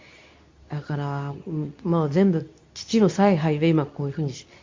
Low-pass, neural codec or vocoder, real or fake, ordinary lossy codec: 7.2 kHz; codec, 24 kHz, 0.9 kbps, WavTokenizer, medium speech release version 2; fake; MP3, 64 kbps